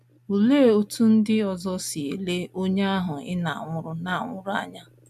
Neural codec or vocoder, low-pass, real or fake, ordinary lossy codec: none; 14.4 kHz; real; none